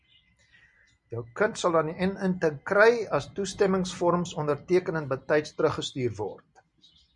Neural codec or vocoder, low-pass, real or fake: none; 10.8 kHz; real